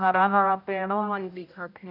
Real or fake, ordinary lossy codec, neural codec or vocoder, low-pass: fake; none; codec, 16 kHz, 0.5 kbps, X-Codec, HuBERT features, trained on general audio; 5.4 kHz